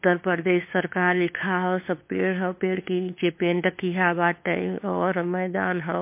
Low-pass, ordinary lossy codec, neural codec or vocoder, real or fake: 3.6 kHz; MP3, 24 kbps; codec, 16 kHz, 2 kbps, FunCodec, trained on LibriTTS, 25 frames a second; fake